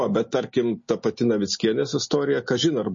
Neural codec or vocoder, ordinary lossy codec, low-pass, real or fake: none; MP3, 32 kbps; 7.2 kHz; real